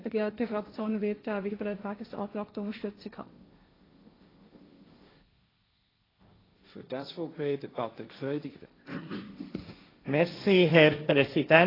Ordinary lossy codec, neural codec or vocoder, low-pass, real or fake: AAC, 24 kbps; codec, 16 kHz, 1.1 kbps, Voila-Tokenizer; 5.4 kHz; fake